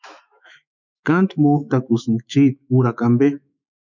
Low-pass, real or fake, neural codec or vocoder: 7.2 kHz; fake; autoencoder, 48 kHz, 128 numbers a frame, DAC-VAE, trained on Japanese speech